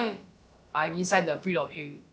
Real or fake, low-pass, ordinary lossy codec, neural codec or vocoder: fake; none; none; codec, 16 kHz, about 1 kbps, DyCAST, with the encoder's durations